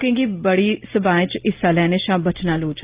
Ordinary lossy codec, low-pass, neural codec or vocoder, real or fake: Opus, 32 kbps; 3.6 kHz; none; real